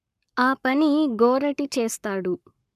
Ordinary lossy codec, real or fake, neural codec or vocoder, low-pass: none; fake; codec, 44.1 kHz, 7.8 kbps, Pupu-Codec; 14.4 kHz